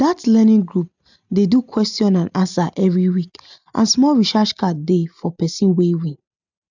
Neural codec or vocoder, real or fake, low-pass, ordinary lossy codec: none; real; 7.2 kHz; none